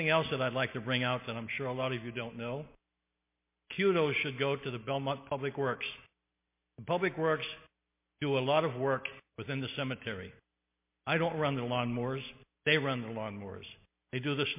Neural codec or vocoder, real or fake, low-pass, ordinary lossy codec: none; real; 3.6 kHz; MP3, 24 kbps